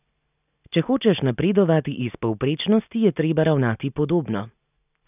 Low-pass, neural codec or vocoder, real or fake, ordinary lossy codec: 3.6 kHz; none; real; none